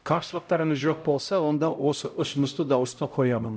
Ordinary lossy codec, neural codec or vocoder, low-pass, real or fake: none; codec, 16 kHz, 0.5 kbps, X-Codec, HuBERT features, trained on LibriSpeech; none; fake